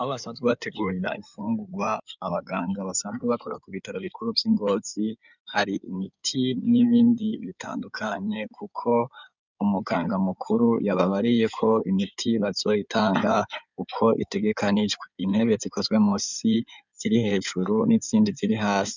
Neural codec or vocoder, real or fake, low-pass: codec, 16 kHz in and 24 kHz out, 2.2 kbps, FireRedTTS-2 codec; fake; 7.2 kHz